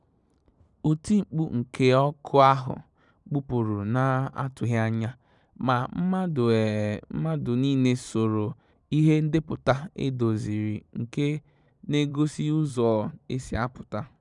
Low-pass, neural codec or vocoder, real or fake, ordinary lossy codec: 10.8 kHz; vocoder, 44.1 kHz, 128 mel bands every 512 samples, BigVGAN v2; fake; none